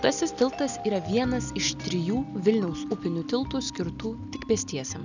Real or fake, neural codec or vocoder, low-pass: real; none; 7.2 kHz